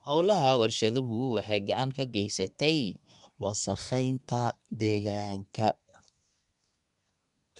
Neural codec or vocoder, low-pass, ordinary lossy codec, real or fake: codec, 24 kHz, 1 kbps, SNAC; 10.8 kHz; none; fake